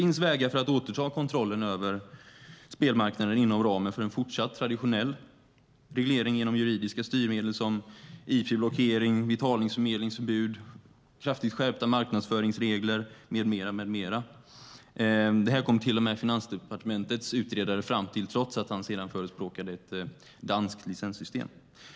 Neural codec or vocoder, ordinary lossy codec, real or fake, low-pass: none; none; real; none